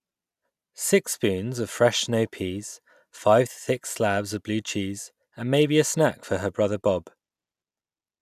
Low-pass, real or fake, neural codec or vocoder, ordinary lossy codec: 14.4 kHz; real; none; none